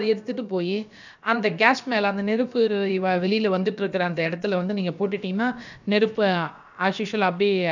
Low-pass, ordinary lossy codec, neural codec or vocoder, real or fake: 7.2 kHz; none; codec, 16 kHz, about 1 kbps, DyCAST, with the encoder's durations; fake